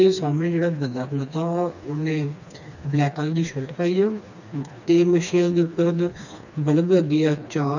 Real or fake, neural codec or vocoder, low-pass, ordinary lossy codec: fake; codec, 16 kHz, 2 kbps, FreqCodec, smaller model; 7.2 kHz; none